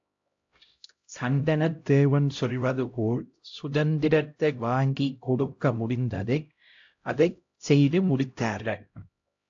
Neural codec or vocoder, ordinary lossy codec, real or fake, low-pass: codec, 16 kHz, 0.5 kbps, X-Codec, HuBERT features, trained on LibriSpeech; AAC, 48 kbps; fake; 7.2 kHz